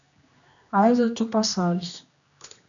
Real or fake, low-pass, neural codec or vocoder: fake; 7.2 kHz; codec, 16 kHz, 1 kbps, X-Codec, HuBERT features, trained on general audio